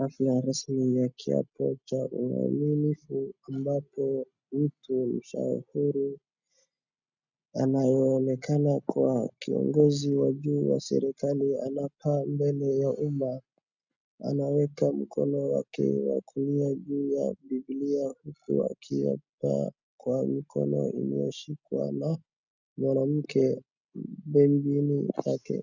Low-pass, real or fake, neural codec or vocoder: 7.2 kHz; real; none